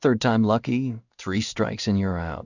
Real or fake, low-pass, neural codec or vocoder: fake; 7.2 kHz; codec, 16 kHz in and 24 kHz out, 1 kbps, XY-Tokenizer